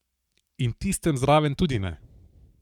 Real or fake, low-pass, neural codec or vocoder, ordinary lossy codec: fake; 19.8 kHz; codec, 44.1 kHz, 7.8 kbps, Pupu-Codec; none